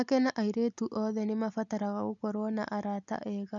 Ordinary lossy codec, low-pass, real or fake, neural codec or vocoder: none; 7.2 kHz; real; none